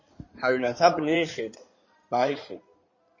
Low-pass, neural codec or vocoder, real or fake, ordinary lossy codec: 7.2 kHz; codec, 16 kHz in and 24 kHz out, 2.2 kbps, FireRedTTS-2 codec; fake; MP3, 32 kbps